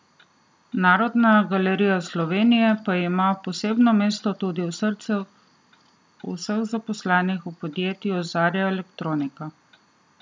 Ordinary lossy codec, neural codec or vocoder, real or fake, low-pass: none; none; real; none